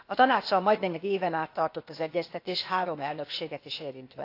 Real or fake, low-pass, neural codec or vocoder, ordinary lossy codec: fake; 5.4 kHz; codec, 16 kHz, 0.8 kbps, ZipCodec; AAC, 32 kbps